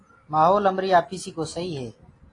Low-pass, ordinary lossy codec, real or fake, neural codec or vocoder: 10.8 kHz; AAC, 32 kbps; real; none